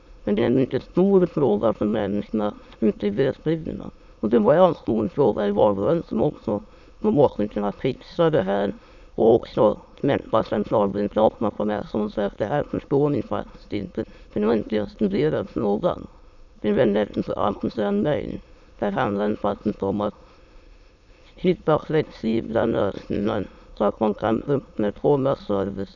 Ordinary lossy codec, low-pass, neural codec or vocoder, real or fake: none; 7.2 kHz; autoencoder, 22.05 kHz, a latent of 192 numbers a frame, VITS, trained on many speakers; fake